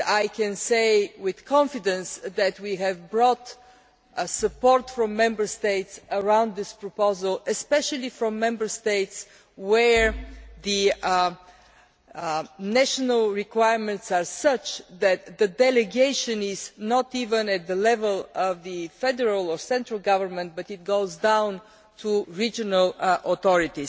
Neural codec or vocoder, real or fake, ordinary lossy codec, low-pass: none; real; none; none